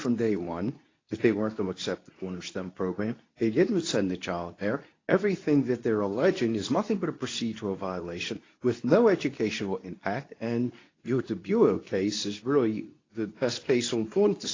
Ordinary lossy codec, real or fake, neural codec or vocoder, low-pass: AAC, 32 kbps; fake; codec, 24 kHz, 0.9 kbps, WavTokenizer, medium speech release version 2; 7.2 kHz